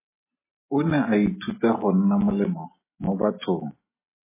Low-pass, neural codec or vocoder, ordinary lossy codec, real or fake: 3.6 kHz; none; MP3, 16 kbps; real